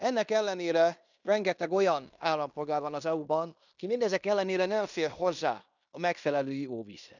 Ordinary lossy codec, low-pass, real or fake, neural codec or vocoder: none; 7.2 kHz; fake; codec, 16 kHz in and 24 kHz out, 0.9 kbps, LongCat-Audio-Codec, fine tuned four codebook decoder